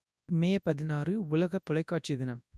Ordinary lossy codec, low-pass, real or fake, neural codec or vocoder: none; none; fake; codec, 24 kHz, 0.9 kbps, WavTokenizer, large speech release